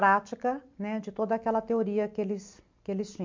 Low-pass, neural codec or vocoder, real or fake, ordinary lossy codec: 7.2 kHz; none; real; MP3, 64 kbps